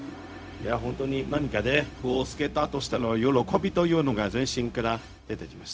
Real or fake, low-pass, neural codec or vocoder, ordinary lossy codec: fake; none; codec, 16 kHz, 0.4 kbps, LongCat-Audio-Codec; none